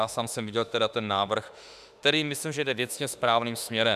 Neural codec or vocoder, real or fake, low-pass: autoencoder, 48 kHz, 32 numbers a frame, DAC-VAE, trained on Japanese speech; fake; 14.4 kHz